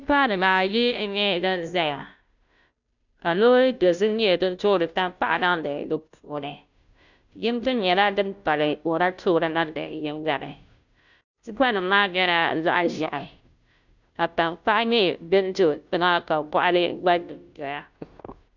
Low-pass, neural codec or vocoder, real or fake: 7.2 kHz; codec, 16 kHz, 0.5 kbps, FunCodec, trained on Chinese and English, 25 frames a second; fake